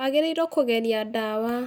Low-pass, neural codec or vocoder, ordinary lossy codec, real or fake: none; none; none; real